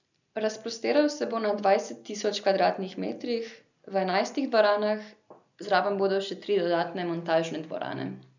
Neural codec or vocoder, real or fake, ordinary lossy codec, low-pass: none; real; none; 7.2 kHz